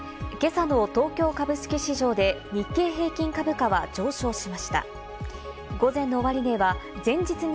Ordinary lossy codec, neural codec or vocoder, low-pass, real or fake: none; none; none; real